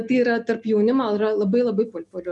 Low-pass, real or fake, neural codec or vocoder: 10.8 kHz; real; none